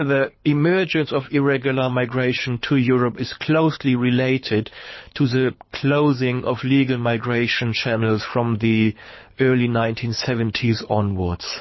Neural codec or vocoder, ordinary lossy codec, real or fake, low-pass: codec, 24 kHz, 6 kbps, HILCodec; MP3, 24 kbps; fake; 7.2 kHz